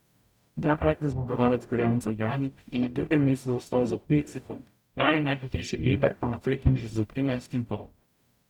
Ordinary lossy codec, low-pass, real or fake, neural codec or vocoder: none; 19.8 kHz; fake; codec, 44.1 kHz, 0.9 kbps, DAC